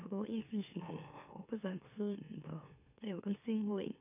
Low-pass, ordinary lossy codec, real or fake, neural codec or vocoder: 3.6 kHz; none; fake; autoencoder, 44.1 kHz, a latent of 192 numbers a frame, MeloTTS